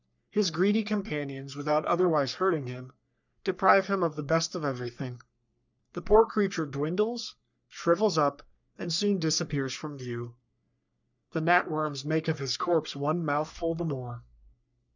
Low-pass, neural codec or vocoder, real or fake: 7.2 kHz; codec, 44.1 kHz, 3.4 kbps, Pupu-Codec; fake